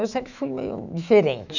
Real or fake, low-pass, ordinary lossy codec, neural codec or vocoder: fake; 7.2 kHz; none; autoencoder, 48 kHz, 128 numbers a frame, DAC-VAE, trained on Japanese speech